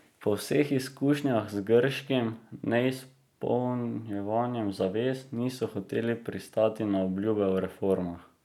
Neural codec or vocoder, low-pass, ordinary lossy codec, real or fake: none; 19.8 kHz; none; real